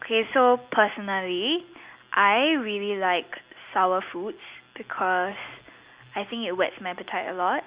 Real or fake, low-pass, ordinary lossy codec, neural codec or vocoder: real; 3.6 kHz; Opus, 64 kbps; none